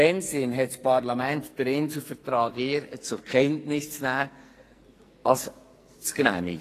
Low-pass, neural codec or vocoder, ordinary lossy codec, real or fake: 14.4 kHz; codec, 44.1 kHz, 2.6 kbps, SNAC; AAC, 48 kbps; fake